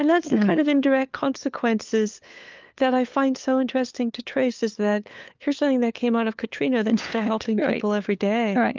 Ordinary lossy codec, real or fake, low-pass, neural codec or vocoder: Opus, 24 kbps; fake; 7.2 kHz; codec, 16 kHz, 4 kbps, FunCodec, trained on LibriTTS, 50 frames a second